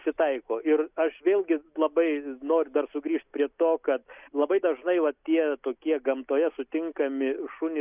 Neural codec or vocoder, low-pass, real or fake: none; 3.6 kHz; real